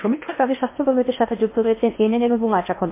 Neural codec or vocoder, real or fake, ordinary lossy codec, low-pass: codec, 16 kHz in and 24 kHz out, 0.8 kbps, FocalCodec, streaming, 65536 codes; fake; MP3, 24 kbps; 3.6 kHz